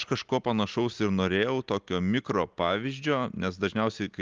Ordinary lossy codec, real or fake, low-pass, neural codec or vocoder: Opus, 32 kbps; real; 7.2 kHz; none